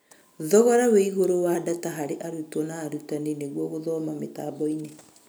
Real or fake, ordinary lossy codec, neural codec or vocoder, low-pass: real; none; none; none